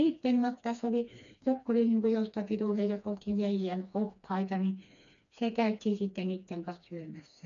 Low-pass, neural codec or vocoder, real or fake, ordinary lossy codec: 7.2 kHz; codec, 16 kHz, 2 kbps, FreqCodec, smaller model; fake; none